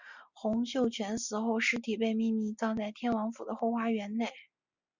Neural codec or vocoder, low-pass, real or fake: none; 7.2 kHz; real